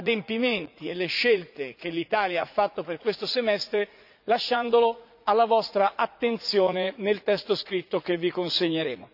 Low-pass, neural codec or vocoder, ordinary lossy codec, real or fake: 5.4 kHz; vocoder, 44.1 kHz, 80 mel bands, Vocos; none; fake